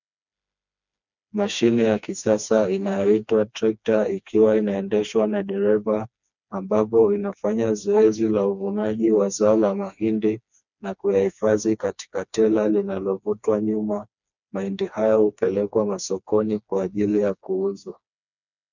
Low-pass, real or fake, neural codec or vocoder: 7.2 kHz; fake; codec, 16 kHz, 2 kbps, FreqCodec, smaller model